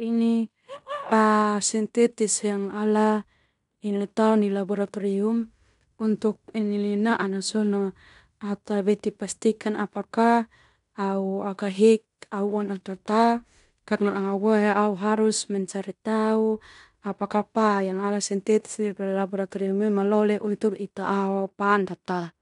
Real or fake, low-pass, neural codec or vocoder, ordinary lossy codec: fake; 10.8 kHz; codec, 16 kHz in and 24 kHz out, 0.9 kbps, LongCat-Audio-Codec, fine tuned four codebook decoder; none